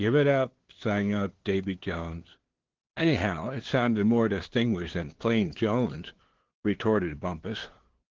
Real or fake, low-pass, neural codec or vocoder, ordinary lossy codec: fake; 7.2 kHz; codec, 16 kHz, 2 kbps, FunCodec, trained on LibriTTS, 25 frames a second; Opus, 16 kbps